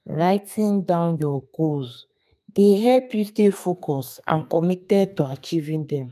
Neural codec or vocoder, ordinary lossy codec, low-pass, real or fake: codec, 32 kHz, 1.9 kbps, SNAC; none; 14.4 kHz; fake